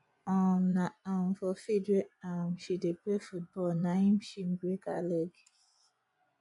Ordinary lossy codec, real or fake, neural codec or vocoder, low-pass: none; fake; vocoder, 24 kHz, 100 mel bands, Vocos; 10.8 kHz